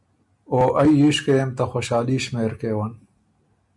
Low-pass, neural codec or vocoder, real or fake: 10.8 kHz; none; real